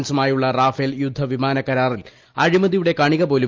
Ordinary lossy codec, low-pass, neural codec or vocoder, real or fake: Opus, 32 kbps; 7.2 kHz; none; real